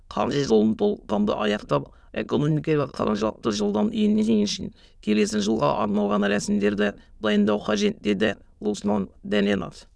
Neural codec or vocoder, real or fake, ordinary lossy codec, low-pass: autoencoder, 22.05 kHz, a latent of 192 numbers a frame, VITS, trained on many speakers; fake; none; none